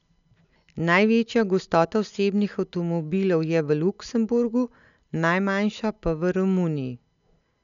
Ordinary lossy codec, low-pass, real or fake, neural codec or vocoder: none; 7.2 kHz; real; none